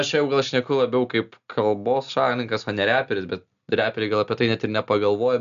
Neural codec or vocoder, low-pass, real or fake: none; 7.2 kHz; real